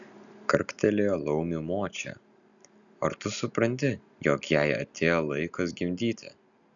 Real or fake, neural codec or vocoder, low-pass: real; none; 7.2 kHz